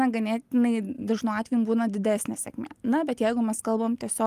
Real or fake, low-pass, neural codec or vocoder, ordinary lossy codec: real; 14.4 kHz; none; Opus, 32 kbps